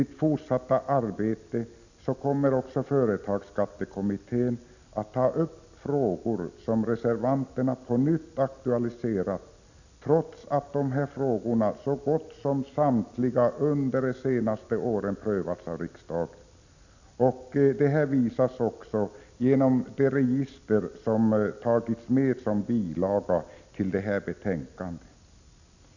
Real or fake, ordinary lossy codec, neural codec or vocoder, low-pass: real; none; none; 7.2 kHz